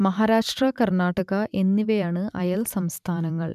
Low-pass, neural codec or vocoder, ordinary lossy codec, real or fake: 14.4 kHz; vocoder, 44.1 kHz, 128 mel bands every 512 samples, BigVGAN v2; none; fake